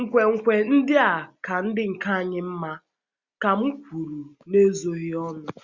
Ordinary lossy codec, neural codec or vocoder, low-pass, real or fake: Opus, 64 kbps; none; 7.2 kHz; real